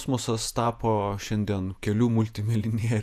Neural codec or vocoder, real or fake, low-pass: vocoder, 48 kHz, 128 mel bands, Vocos; fake; 14.4 kHz